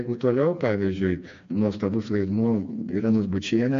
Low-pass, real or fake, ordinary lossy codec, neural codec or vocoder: 7.2 kHz; fake; AAC, 64 kbps; codec, 16 kHz, 2 kbps, FreqCodec, smaller model